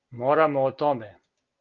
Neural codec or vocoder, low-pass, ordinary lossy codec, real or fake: none; 7.2 kHz; Opus, 32 kbps; real